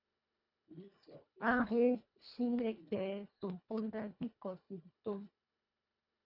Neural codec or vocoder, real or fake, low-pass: codec, 24 kHz, 1.5 kbps, HILCodec; fake; 5.4 kHz